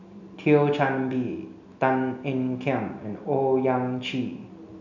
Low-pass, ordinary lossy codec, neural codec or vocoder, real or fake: 7.2 kHz; none; none; real